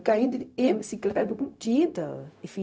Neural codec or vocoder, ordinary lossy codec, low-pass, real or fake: codec, 16 kHz, 0.4 kbps, LongCat-Audio-Codec; none; none; fake